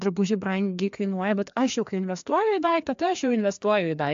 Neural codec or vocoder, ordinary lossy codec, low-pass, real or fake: codec, 16 kHz, 2 kbps, FreqCodec, larger model; AAC, 64 kbps; 7.2 kHz; fake